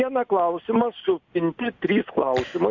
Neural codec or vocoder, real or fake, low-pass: none; real; 7.2 kHz